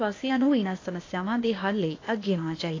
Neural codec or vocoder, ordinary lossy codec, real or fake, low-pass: codec, 16 kHz, 0.8 kbps, ZipCodec; AAC, 32 kbps; fake; 7.2 kHz